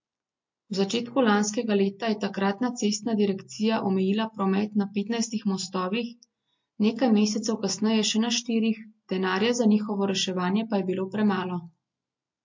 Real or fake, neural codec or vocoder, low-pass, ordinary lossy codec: real; none; 7.2 kHz; MP3, 48 kbps